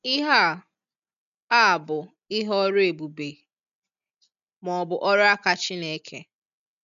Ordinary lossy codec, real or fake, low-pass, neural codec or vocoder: none; real; 7.2 kHz; none